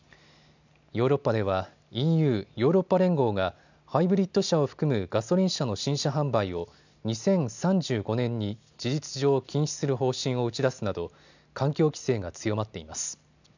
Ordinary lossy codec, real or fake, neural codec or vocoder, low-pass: none; real; none; 7.2 kHz